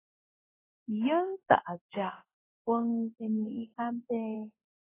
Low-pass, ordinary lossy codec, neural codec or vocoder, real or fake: 3.6 kHz; AAC, 16 kbps; codec, 16 kHz in and 24 kHz out, 1 kbps, XY-Tokenizer; fake